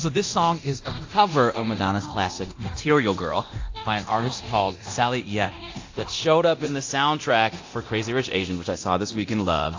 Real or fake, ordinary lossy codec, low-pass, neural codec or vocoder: fake; AAC, 48 kbps; 7.2 kHz; codec, 24 kHz, 0.9 kbps, DualCodec